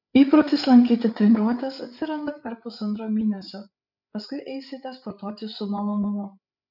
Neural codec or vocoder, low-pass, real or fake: codec, 16 kHz, 8 kbps, FreqCodec, larger model; 5.4 kHz; fake